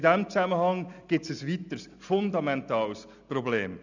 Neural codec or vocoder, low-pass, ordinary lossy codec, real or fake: none; 7.2 kHz; none; real